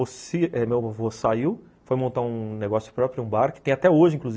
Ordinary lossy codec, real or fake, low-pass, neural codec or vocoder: none; real; none; none